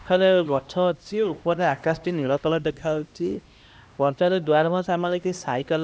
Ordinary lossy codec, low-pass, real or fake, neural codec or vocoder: none; none; fake; codec, 16 kHz, 1 kbps, X-Codec, HuBERT features, trained on LibriSpeech